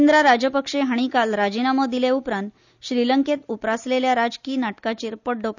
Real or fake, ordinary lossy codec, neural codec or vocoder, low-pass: real; none; none; 7.2 kHz